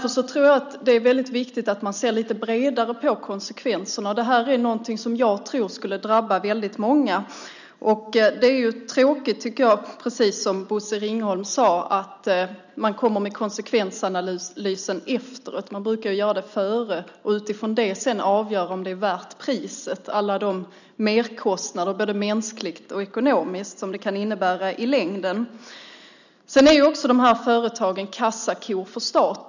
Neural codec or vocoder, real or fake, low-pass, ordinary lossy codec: none; real; 7.2 kHz; none